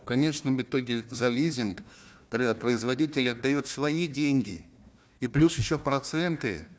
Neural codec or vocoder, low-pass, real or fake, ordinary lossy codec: codec, 16 kHz, 1 kbps, FunCodec, trained on Chinese and English, 50 frames a second; none; fake; none